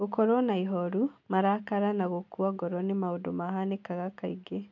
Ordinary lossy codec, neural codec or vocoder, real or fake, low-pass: none; none; real; 7.2 kHz